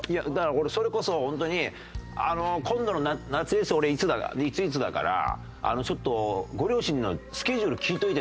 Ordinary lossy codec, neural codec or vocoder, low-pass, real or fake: none; none; none; real